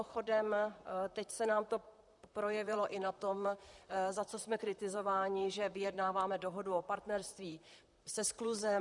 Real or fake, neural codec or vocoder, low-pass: fake; vocoder, 44.1 kHz, 128 mel bands, Pupu-Vocoder; 10.8 kHz